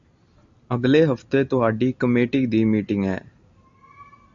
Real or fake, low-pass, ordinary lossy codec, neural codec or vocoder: real; 7.2 kHz; Opus, 64 kbps; none